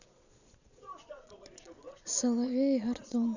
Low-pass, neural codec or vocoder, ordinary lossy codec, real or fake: 7.2 kHz; vocoder, 22.05 kHz, 80 mel bands, Vocos; none; fake